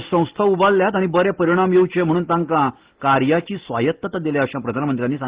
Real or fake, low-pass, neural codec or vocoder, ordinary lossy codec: real; 3.6 kHz; none; Opus, 16 kbps